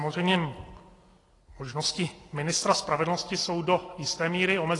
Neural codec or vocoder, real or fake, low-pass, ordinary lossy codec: none; real; 10.8 kHz; AAC, 32 kbps